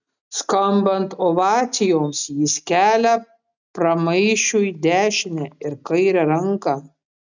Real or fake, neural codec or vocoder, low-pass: real; none; 7.2 kHz